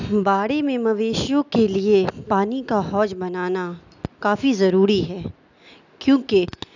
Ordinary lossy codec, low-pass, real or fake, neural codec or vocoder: none; 7.2 kHz; real; none